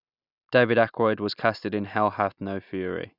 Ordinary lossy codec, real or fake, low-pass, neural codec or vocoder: none; real; 5.4 kHz; none